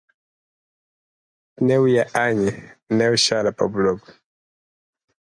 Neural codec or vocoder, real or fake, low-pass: none; real; 9.9 kHz